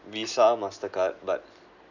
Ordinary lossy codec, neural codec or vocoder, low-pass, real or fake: none; none; 7.2 kHz; real